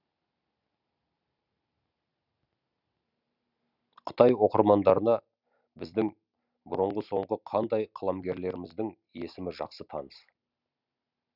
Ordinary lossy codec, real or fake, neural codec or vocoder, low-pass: none; real; none; 5.4 kHz